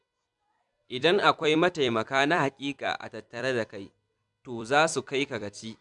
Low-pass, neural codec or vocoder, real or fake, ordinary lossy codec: 10.8 kHz; vocoder, 44.1 kHz, 128 mel bands every 256 samples, BigVGAN v2; fake; none